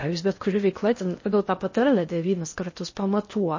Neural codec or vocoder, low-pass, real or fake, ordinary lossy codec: codec, 16 kHz in and 24 kHz out, 0.6 kbps, FocalCodec, streaming, 4096 codes; 7.2 kHz; fake; MP3, 32 kbps